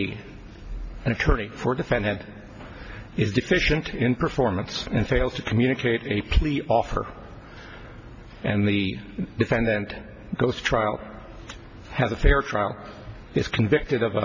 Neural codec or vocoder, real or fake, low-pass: none; real; 7.2 kHz